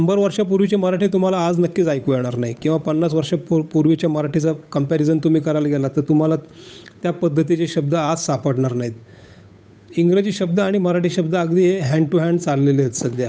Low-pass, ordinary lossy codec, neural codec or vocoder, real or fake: none; none; codec, 16 kHz, 8 kbps, FunCodec, trained on Chinese and English, 25 frames a second; fake